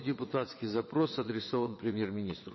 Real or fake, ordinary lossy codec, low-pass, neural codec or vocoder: fake; MP3, 24 kbps; 7.2 kHz; vocoder, 22.05 kHz, 80 mel bands, WaveNeXt